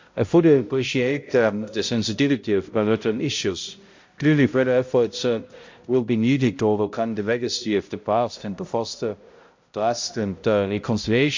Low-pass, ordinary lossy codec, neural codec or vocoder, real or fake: 7.2 kHz; MP3, 48 kbps; codec, 16 kHz, 0.5 kbps, X-Codec, HuBERT features, trained on balanced general audio; fake